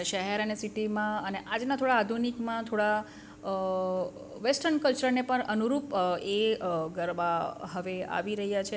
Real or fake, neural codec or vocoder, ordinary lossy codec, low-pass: real; none; none; none